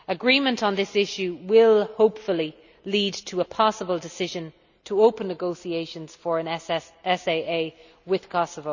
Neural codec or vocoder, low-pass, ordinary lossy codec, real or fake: none; 7.2 kHz; none; real